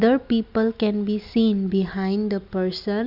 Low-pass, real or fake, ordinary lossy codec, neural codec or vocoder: 5.4 kHz; real; Opus, 64 kbps; none